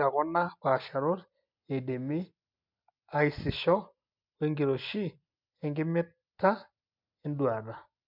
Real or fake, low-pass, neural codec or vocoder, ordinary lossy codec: real; 5.4 kHz; none; MP3, 48 kbps